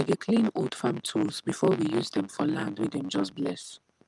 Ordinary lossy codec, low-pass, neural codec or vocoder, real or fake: Opus, 32 kbps; 10.8 kHz; none; real